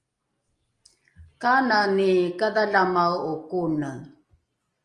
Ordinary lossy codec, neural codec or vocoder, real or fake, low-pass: Opus, 32 kbps; none; real; 10.8 kHz